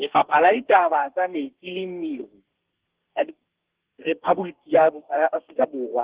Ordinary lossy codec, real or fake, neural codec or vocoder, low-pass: Opus, 16 kbps; fake; codec, 32 kHz, 1.9 kbps, SNAC; 3.6 kHz